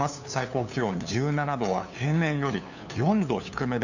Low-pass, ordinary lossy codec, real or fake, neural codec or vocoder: 7.2 kHz; none; fake; codec, 16 kHz, 2 kbps, FunCodec, trained on LibriTTS, 25 frames a second